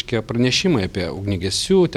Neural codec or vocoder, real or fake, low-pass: vocoder, 44.1 kHz, 128 mel bands every 256 samples, BigVGAN v2; fake; 19.8 kHz